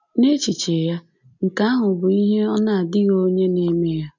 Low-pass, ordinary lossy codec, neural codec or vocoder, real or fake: 7.2 kHz; none; none; real